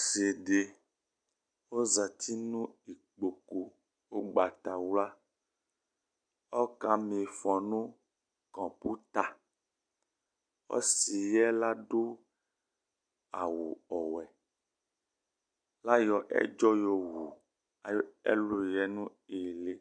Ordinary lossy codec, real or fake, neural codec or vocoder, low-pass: MP3, 64 kbps; real; none; 9.9 kHz